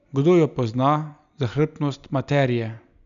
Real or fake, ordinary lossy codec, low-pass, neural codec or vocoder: real; none; 7.2 kHz; none